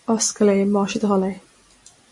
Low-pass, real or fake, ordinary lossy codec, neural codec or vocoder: 10.8 kHz; real; MP3, 48 kbps; none